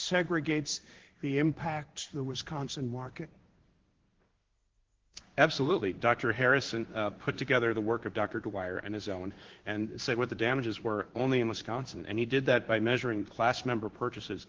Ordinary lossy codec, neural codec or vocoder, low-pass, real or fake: Opus, 16 kbps; codec, 16 kHz in and 24 kHz out, 1 kbps, XY-Tokenizer; 7.2 kHz; fake